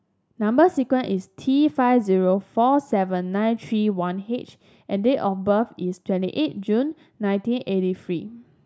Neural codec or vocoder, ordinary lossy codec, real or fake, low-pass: none; none; real; none